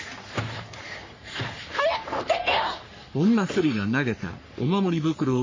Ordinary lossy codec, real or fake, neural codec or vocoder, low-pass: MP3, 32 kbps; fake; codec, 44.1 kHz, 3.4 kbps, Pupu-Codec; 7.2 kHz